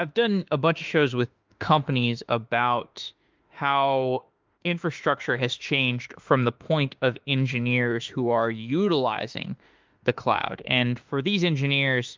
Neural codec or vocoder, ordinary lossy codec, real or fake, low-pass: autoencoder, 48 kHz, 32 numbers a frame, DAC-VAE, trained on Japanese speech; Opus, 32 kbps; fake; 7.2 kHz